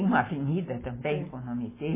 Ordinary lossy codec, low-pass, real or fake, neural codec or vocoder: MP3, 16 kbps; 3.6 kHz; real; none